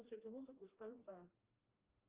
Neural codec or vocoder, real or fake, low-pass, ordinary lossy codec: codec, 16 kHz, 1 kbps, FreqCodec, smaller model; fake; 3.6 kHz; Opus, 32 kbps